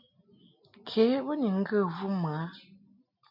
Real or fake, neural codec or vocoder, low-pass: real; none; 5.4 kHz